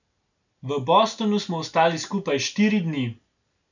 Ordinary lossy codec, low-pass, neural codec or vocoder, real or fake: none; 7.2 kHz; none; real